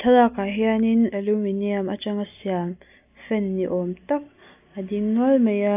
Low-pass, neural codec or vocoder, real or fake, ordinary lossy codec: 3.6 kHz; none; real; AAC, 32 kbps